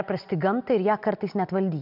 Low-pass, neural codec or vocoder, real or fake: 5.4 kHz; none; real